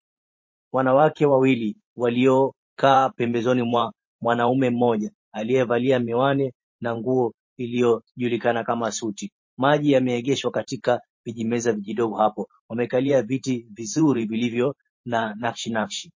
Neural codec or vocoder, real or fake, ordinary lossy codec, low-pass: vocoder, 44.1 kHz, 128 mel bands every 512 samples, BigVGAN v2; fake; MP3, 32 kbps; 7.2 kHz